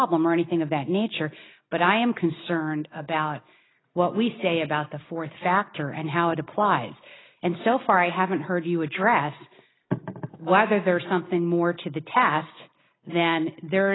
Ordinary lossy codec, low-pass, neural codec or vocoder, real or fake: AAC, 16 kbps; 7.2 kHz; none; real